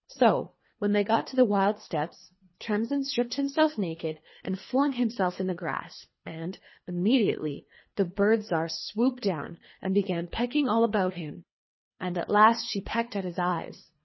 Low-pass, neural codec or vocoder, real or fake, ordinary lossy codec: 7.2 kHz; codec, 24 kHz, 3 kbps, HILCodec; fake; MP3, 24 kbps